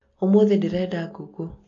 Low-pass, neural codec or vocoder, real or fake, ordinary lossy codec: 7.2 kHz; none; real; AAC, 32 kbps